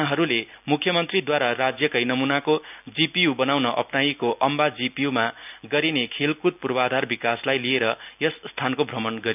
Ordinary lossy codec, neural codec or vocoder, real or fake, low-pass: none; none; real; 3.6 kHz